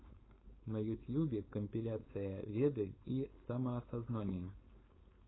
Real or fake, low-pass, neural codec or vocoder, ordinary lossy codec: fake; 7.2 kHz; codec, 16 kHz, 4.8 kbps, FACodec; AAC, 16 kbps